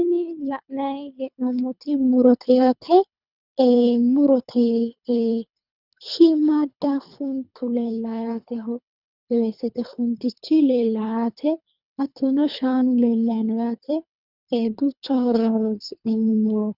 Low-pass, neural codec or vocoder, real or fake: 5.4 kHz; codec, 24 kHz, 3 kbps, HILCodec; fake